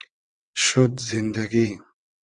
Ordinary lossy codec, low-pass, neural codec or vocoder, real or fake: Opus, 64 kbps; 9.9 kHz; vocoder, 22.05 kHz, 80 mel bands, WaveNeXt; fake